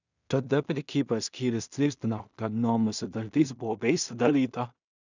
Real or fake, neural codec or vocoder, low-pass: fake; codec, 16 kHz in and 24 kHz out, 0.4 kbps, LongCat-Audio-Codec, two codebook decoder; 7.2 kHz